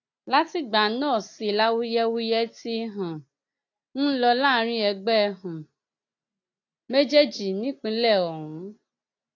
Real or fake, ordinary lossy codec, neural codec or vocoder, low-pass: real; AAC, 48 kbps; none; 7.2 kHz